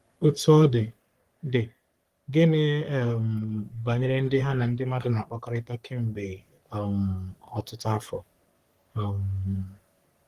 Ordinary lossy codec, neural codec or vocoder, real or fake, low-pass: Opus, 24 kbps; codec, 44.1 kHz, 3.4 kbps, Pupu-Codec; fake; 14.4 kHz